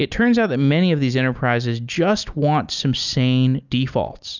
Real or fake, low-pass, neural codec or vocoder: real; 7.2 kHz; none